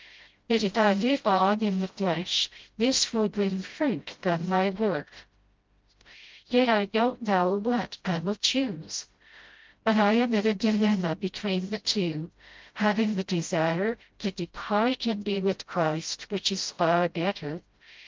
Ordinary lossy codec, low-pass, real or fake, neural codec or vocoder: Opus, 24 kbps; 7.2 kHz; fake; codec, 16 kHz, 0.5 kbps, FreqCodec, smaller model